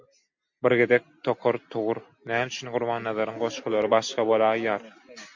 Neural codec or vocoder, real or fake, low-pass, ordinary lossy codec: none; real; 7.2 kHz; MP3, 48 kbps